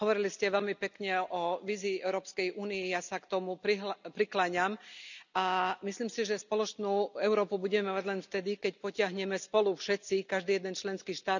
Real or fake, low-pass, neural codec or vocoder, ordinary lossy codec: real; 7.2 kHz; none; none